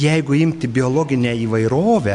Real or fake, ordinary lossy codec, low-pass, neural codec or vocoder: real; AAC, 64 kbps; 10.8 kHz; none